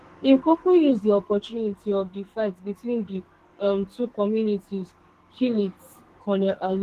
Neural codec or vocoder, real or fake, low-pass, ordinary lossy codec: codec, 44.1 kHz, 2.6 kbps, SNAC; fake; 14.4 kHz; Opus, 16 kbps